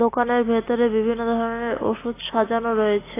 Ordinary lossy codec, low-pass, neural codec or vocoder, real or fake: AAC, 16 kbps; 3.6 kHz; none; real